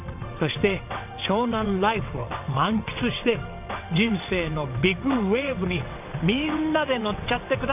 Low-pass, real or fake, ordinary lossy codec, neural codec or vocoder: 3.6 kHz; fake; none; vocoder, 22.05 kHz, 80 mel bands, WaveNeXt